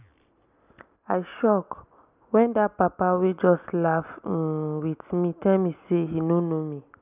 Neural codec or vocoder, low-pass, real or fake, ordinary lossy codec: none; 3.6 kHz; real; none